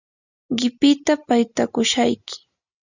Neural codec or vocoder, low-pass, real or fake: none; 7.2 kHz; real